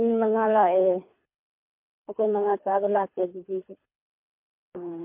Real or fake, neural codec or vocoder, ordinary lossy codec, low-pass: fake; codec, 24 kHz, 3 kbps, HILCodec; MP3, 32 kbps; 3.6 kHz